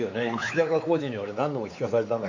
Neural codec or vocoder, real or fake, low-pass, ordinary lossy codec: codec, 16 kHz, 4 kbps, X-Codec, WavLM features, trained on Multilingual LibriSpeech; fake; 7.2 kHz; AAC, 48 kbps